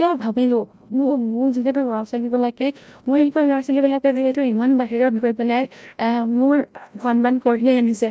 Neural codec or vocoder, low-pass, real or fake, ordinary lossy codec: codec, 16 kHz, 0.5 kbps, FreqCodec, larger model; none; fake; none